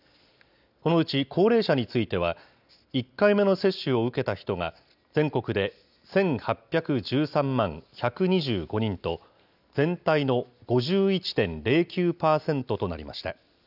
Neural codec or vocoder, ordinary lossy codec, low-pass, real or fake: none; none; 5.4 kHz; real